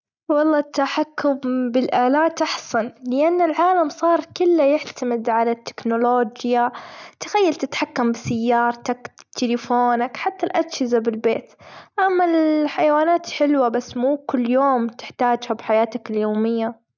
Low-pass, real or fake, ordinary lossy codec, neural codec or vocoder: 7.2 kHz; real; none; none